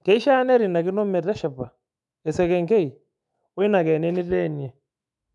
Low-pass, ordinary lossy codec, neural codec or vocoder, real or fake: 10.8 kHz; none; autoencoder, 48 kHz, 128 numbers a frame, DAC-VAE, trained on Japanese speech; fake